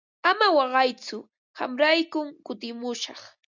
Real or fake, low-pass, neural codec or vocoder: real; 7.2 kHz; none